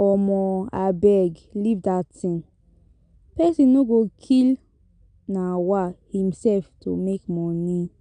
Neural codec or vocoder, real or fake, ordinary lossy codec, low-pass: none; real; none; 9.9 kHz